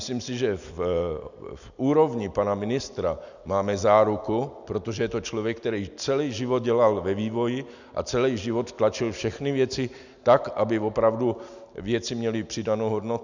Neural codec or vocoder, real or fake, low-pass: none; real; 7.2 kHz